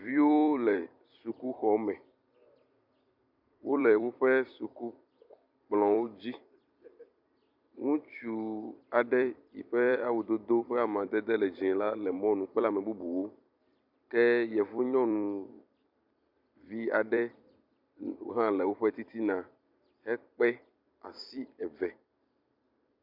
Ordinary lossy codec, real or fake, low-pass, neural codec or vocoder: MP3, 48 kbps; fake; 5.4 kHz; vocoder, 44.1 kHz, 128 mel bands every 256 samples, BigVGAN v2